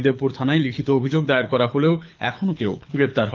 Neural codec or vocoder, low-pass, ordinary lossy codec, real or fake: codec, 24 kHz, 6 kbps, HILCodec; 7.2 kHz; Opus, 32 kbps; fake